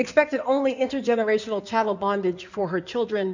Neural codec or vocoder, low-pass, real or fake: codec, 16 kHz in and 24 kHz out, 2.2 kbps, FireRedTTS-2 codec; 7.2 kHz; fake